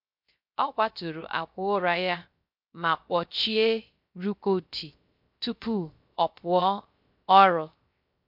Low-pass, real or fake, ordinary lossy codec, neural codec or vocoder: 5.4 kHz; fake; MP3, 48 kbps; codec, 16 kHz, 0.3 kbps, FocalCodec